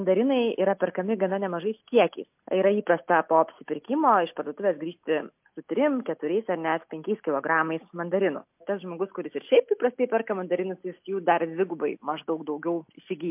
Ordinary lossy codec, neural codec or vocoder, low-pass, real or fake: MP3, 32 kbps; none; 3.6 kHz; real